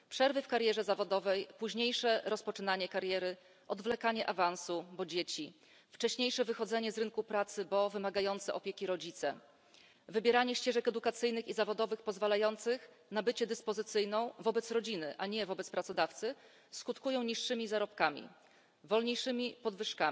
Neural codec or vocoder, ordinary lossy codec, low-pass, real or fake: none; none; none; real